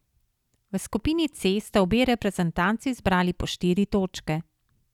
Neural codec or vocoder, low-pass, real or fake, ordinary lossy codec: none; 19.8 kHz; real; none